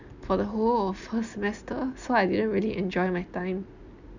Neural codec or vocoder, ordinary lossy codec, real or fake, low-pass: none; none; real; 7.2 kHz